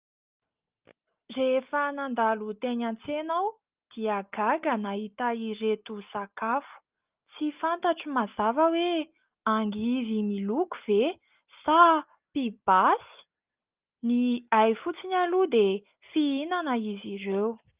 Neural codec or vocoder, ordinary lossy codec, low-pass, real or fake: none; Opus, 32 kbps; 3.6 kHz; real